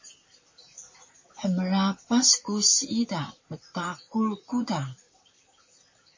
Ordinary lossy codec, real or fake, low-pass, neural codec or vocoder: MP3, 32 kbps; fake; 7.2 kHz; vocoder, 44.1 kHz, 128 mel bands, Pupu-Vocoder